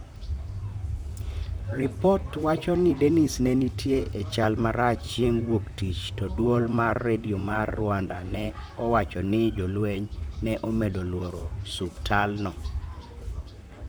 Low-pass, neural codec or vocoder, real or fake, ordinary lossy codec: none; vocoder, 44.1 kHz, 128 mel bands, Pupu-Vocoder; fake; none